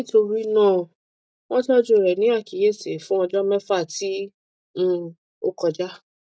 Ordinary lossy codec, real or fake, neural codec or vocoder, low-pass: none; real; none; none